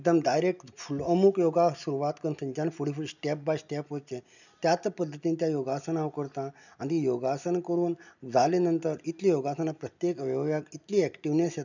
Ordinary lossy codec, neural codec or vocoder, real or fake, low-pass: none; none; real; 7.2 kHz